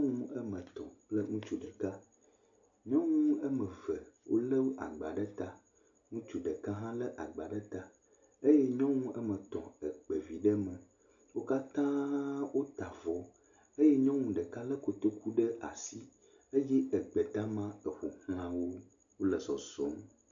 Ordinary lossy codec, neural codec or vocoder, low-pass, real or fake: MP3, 64 kbps; none; 7.2 kHz; real